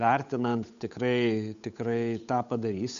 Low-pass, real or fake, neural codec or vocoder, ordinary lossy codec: 7.2 kHz; fake; codec, 16 kHz, 8 kbps, FunCodec, trained on Chinese and English, 25 frames a second; MP3, 96 kbps